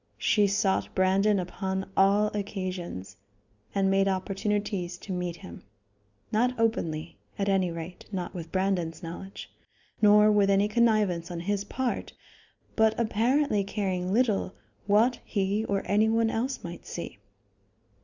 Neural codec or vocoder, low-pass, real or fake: none; 7.2 kHz; real